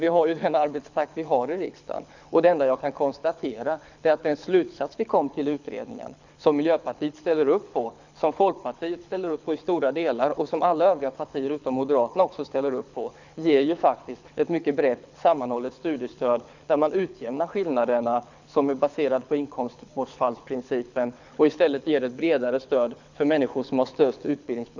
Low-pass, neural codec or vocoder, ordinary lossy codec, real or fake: 7.2 kHz; codec, 24 kHz, 6 kbps, HILCodec; none; fake